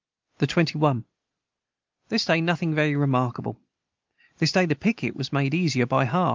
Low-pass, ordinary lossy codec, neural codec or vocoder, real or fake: 7.2 kHz; Opus, 24 kbps; none; real